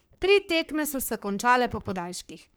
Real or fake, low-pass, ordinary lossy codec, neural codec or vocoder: fake; none; none; codec, 44.1 kHz, 3.4 kbps, Pupu-Codec